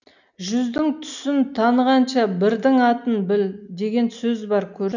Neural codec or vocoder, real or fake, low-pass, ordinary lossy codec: none; real; 7.2 kHz; none